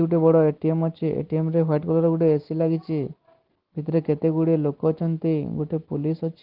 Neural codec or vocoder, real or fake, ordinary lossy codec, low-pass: none; real; Opus, 16 kbps; 5.4 kHz